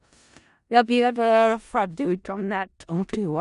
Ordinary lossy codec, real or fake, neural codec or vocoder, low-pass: none; fake; codec, 16 kHz in and 24 kHz out, 0.4 kbps, LongCat-Audio-Codec, four codebook decoder; 10.8 kHz